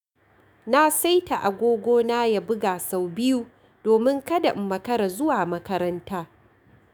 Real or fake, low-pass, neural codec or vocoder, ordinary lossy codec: fake; none; autoencoder, 48 kHz, 128 numbers a frame, DAC-VAE, trained on Japanese speech; none